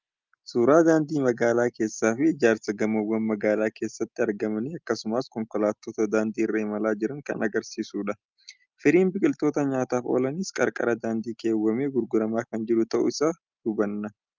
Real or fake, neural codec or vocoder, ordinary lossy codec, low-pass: real; none; Opus, 24 kbps; 7.2 kHz